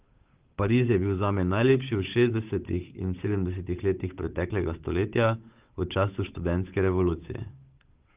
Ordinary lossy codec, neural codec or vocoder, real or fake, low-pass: Opus, 32 kbps; codec, 16 kHz, 8 kbps, FunCodec, trained on Chinese and English, 25 frames a second; fake; 3.6 kHz